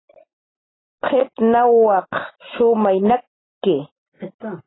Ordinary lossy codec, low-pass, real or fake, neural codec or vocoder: AAC, 16 kbps; 7.2 kHz; real; none